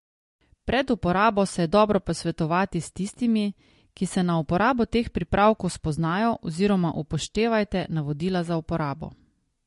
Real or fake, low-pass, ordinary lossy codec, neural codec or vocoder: real; 10.8 kHz; MP3, 48 kbps; none